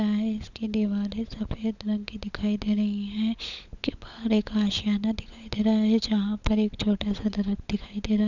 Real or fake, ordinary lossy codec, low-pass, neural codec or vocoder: fake; none; 7.2 kHz; codec, 16 kHz, 16 kbps, FunCodec, trained on LibriTTS, 50 frames a second